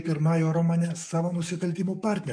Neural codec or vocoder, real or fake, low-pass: codec, 44.1 kHz, 7.8 kbps, DAC; fake; 9.9 kHz